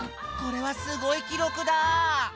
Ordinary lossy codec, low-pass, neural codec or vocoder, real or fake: none; none; none; real